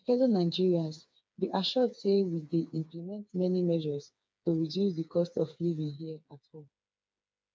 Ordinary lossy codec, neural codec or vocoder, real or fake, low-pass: none; codec, 16 kHz, 4 kbps, FreqCodec, smaller model; fake; none